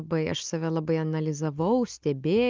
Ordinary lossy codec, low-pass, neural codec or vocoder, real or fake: Opus, 24 kbps; 7.2 kHz; none; real